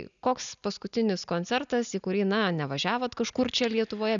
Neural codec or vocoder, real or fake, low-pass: none; real; 7.2 kHz